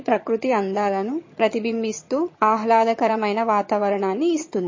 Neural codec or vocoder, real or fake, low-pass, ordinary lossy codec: vocoder, 22.05 kHz, 80 mel bands, HiFi-GAN; fake; 7.2 kHz; MP3, 32 kbps